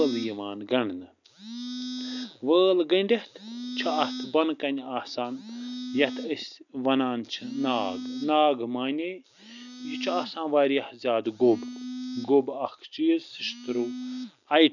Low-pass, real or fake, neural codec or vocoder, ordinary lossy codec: 7.2 kHz; real; none; none